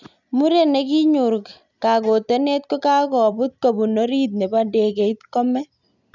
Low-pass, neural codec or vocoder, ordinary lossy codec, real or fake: 7.2 kHz; none; none; real